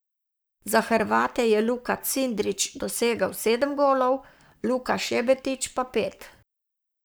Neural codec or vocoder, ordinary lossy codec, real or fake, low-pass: codec, 44.1 kHz, 7.8 kbps, Pupu-Codec; none; fake; none